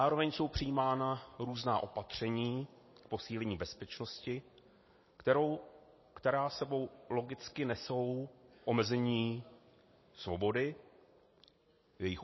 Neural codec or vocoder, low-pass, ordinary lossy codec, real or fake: none; 7.2 kHz; MP3, 24 kbps; real